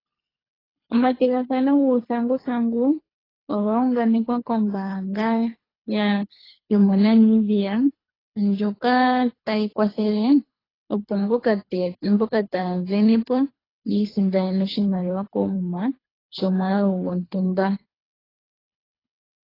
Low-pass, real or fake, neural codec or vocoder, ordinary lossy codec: 5.4 kHz; fake; codec, 24 kHz, 3 kbps, HILCodec; AAC, 24 kbps